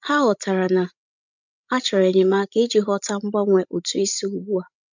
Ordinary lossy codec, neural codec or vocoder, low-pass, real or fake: none; codec, 16 kHz, 16 kbps, FreqCodec, larger model; 7.2 kHz; fake